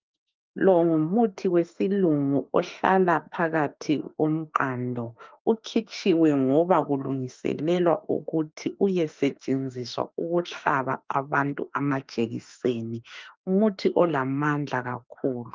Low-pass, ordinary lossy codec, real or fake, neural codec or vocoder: 7.2 kHz; Opus, 24 kbps; fake; autoencoder, 48 kHz, 32 numbers a frame, DAC-VAE, trained on Japanese speech